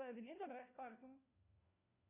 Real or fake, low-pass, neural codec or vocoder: fake; 3.6 kHz; codec, 16 kHz, 1 kbps, FunCodec, trained on LibriTTS, 50 frames a second